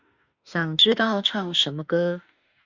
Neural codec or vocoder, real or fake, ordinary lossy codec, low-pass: autoencoder, 48 kHz, 32 numbers a frame, DAC-VAE, trained on Japanese speech; fake; Opus, 64 kbps; 7.2 kHz